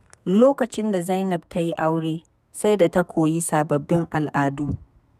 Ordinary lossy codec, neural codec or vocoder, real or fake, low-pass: none; codec, 32 kHz, 1.9 kbps, SNAC; fake; 14.4 kHz